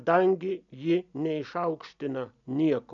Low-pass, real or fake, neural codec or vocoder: 7.2 kHz; real; none